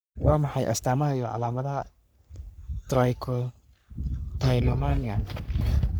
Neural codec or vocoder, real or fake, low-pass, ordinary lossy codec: codec, 44.1 kHz, 3.4 kbps, Pupu-Codec; fake; none; none